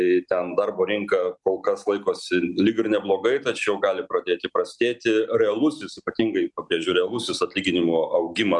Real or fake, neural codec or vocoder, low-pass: real; none; 10.8 kHz